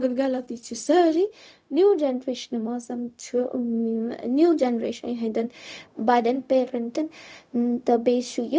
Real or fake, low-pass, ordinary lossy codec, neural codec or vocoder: fake; none; none; codec, 16 kHz, 0.4 kbps, LongCat-Audio-Codec